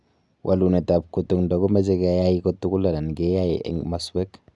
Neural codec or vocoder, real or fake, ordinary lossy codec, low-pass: none; real; none; 10.8 kHz